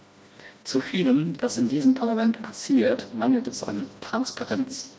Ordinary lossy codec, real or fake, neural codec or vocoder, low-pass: none; fake; codec, 16 kHz, 1 kbps, FreqCodec, smaller model; none